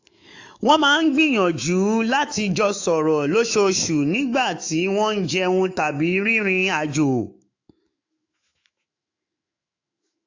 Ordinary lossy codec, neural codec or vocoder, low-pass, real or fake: AAC, 48 kbps; autoencoder, 48 kHz, 128 numbers a frame, DAC-VAE, trained on Japanese speech; 7.2 kHz; fake